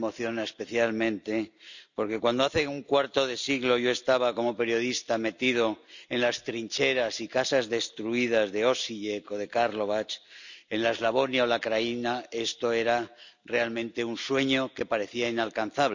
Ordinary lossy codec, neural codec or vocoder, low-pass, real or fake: none; none; 7.2 kHz; real